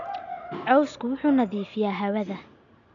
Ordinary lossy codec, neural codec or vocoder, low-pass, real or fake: none; none; 7.2 kHz; real